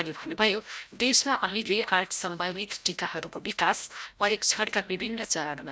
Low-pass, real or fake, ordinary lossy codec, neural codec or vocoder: none; fake; none; codec, 16 kHz, 0.5 kbps, FreqCodec, larger model